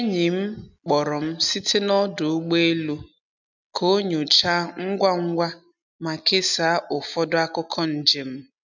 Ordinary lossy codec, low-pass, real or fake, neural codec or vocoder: none; 7.2 kHz; real; none